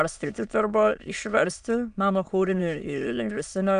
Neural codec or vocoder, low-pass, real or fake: autoencoder, 22.05 kHz, a latent of 192 numbers a frame, VITS, trained on many speakers; 9.9 kHz; fake